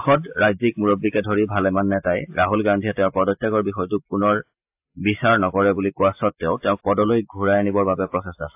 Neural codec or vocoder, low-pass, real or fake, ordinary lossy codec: none; 3.6 kHz; real; AAC, 32 kbps